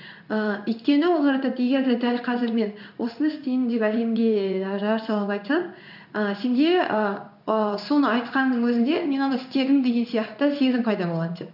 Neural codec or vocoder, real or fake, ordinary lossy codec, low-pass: codec, 16 kHz in and 24 kHz out, 1 kbps, XY-Tokenizer; fake; none; 5.4 kHz